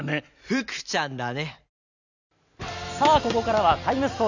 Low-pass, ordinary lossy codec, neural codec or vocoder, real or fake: 7.2 kHz; none; none; real